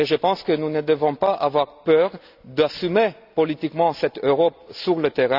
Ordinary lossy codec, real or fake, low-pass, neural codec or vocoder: none; real; 5.4 kHz; none